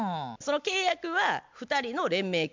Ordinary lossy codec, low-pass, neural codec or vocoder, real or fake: MP3, 64 kbps; 7.2 kHz; none; real